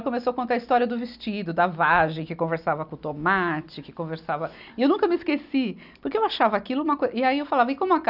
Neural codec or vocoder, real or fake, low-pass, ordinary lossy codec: none; real; 5.4 kHz; none